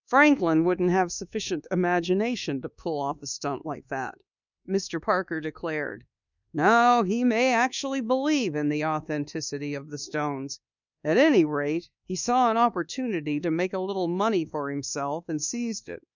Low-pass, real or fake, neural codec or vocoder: 7.2 kHz; fake; codec, 24 kHz, 1.2 kbps, DualCodec